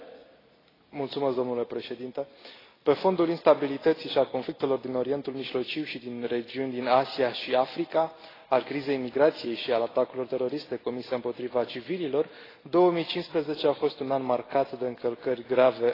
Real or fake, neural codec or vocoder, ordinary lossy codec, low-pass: real; none; AAC, 24 kbps; 5.4 kHz